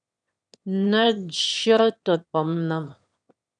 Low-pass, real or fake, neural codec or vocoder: 9.9 kHz; fake; autoencoder, 22.05 kHz, a latent of 192 numbers a frame, VITS, trained on one speaker